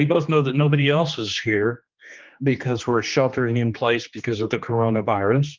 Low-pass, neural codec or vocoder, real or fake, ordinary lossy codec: 7.2 kHz; codec, 16 kHz, 2 kbps, X-Codec, HuBERT features, trained on general audio; fake; Opus, 24 kbps